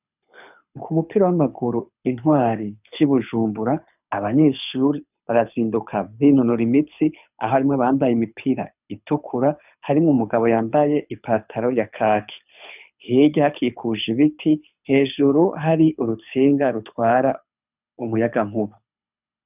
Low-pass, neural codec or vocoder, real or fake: 3.6 kHz; codec, 24 kHz, 6 kbps, HILCodec; fake